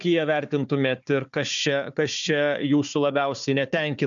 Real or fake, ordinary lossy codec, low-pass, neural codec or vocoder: fake; MP3, 96 kbps; 7.2 kHz; codec, 16 kHz, 4 kbps, FunCodec, trained on Chinese and English, 50 frames a second